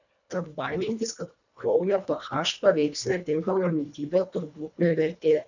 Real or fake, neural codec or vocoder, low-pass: fake; codec, 24 kHz, 1.5 kbps, HILCodec; 7.2 kHz